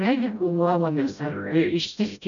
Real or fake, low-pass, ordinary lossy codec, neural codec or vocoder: fake; 7.2 kHz; MP3, 96 kbps; codec, 16 kHz, 0.5 kbps, FreqCodec, smaller model